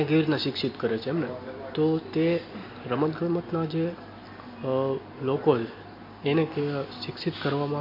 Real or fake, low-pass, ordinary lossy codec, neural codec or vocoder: real; 5.4 kHz; MP3, 32 kbps; none